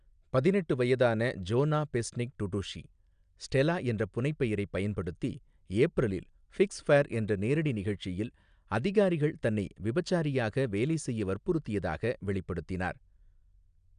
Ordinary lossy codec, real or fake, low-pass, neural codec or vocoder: none; real; 9.9 kHz; none